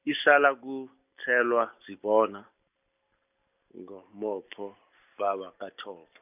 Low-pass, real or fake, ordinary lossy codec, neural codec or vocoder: 3.6 kHz; real; none; none